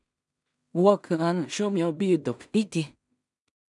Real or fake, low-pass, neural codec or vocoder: fake; 10.8 kHz; codec, 16 kHz in and 24 kHz out, 0.4 kbps, LongCat-Audio-Codec, two codebook decoder